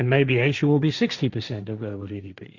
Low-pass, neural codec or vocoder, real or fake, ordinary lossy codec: 7.2 kHz; codec, 16 kHz, 1.1 kbps, Voila-Tokenizer; fake; Opus, 64 kbps